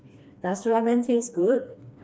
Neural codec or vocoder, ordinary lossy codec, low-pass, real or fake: codec, 16 kHz, 2 kbps, FreqCodec, smaller model; none; none; fake